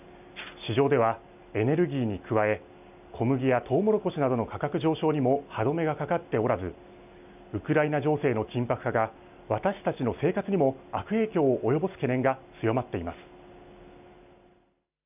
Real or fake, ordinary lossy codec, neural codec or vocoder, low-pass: real; none; none; 3.6 kHz